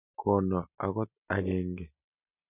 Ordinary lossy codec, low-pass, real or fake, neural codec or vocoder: none; 3.6 kHz; real; none